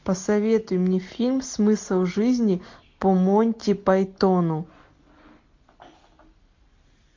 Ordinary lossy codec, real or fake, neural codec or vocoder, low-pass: MP3, 48 kbps; real; none; 7.2 kHz